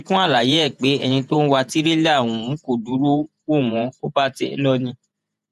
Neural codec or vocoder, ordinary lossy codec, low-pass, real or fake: vocoder, 48 kHz, 128 mel bands, Vocos; none; 14.4 kHz; fake